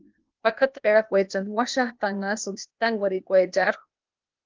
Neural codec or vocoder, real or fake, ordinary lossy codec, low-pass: codec, 16 kHz, 0.8 kbps, ZipCodec; fake; Opus, 32 kbps; 7.2 kHz